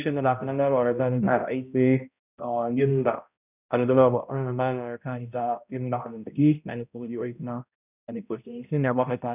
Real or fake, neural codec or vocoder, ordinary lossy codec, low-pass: fake; codec, 16 kHz, 0.5 kbps, X-Codec, HuBERT features, trained on balanced general audio; none; 3.6 kHz